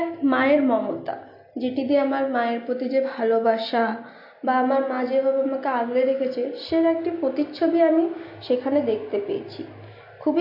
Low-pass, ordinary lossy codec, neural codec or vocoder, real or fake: 5.4 kHz; MP3, 32 kbps; none; real